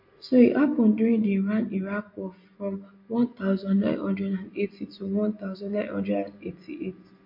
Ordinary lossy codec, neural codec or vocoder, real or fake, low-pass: MP3, 32 kbps; none; real; 5.4 kHz